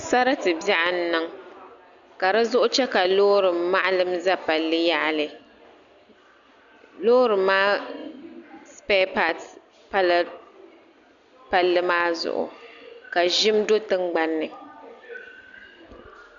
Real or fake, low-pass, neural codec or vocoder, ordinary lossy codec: real; 7.2 kHz; none; Opus, 64 kbps